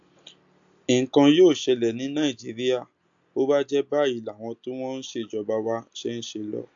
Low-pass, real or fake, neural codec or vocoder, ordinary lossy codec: 7.2 kHz; real; none; AAC, 64 kbps